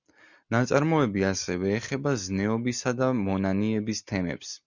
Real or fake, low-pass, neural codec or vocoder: real; 7.2 kHz; none